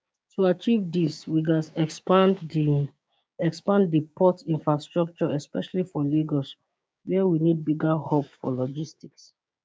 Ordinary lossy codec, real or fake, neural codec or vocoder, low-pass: none; fake; codec, 16 kHz, 6 kbps, DAC; none